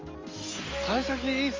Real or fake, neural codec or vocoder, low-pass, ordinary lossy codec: real; none; 7.2 kHz; Opus, 32 kbps